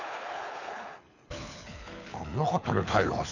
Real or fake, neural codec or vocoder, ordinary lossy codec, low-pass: fake; codec, 24 kHz, 6 kbps, HILCodec; none; 7.2 kHz